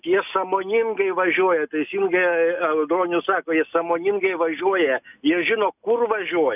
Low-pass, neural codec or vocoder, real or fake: 3.6 kHz; none; real